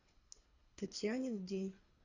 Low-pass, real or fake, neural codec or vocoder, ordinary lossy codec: 7.2 kHz; fake; codec, 24 kHz, 3 kbps, HILCodec; AAC, 48 kbps